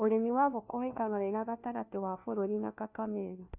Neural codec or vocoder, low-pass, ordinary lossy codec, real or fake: codec, 16 kHz, 1 kbps, FunCodec, trained on LibriTTS, 50 frames a second; 3.6 kHz; AAC, 32 kbps; fake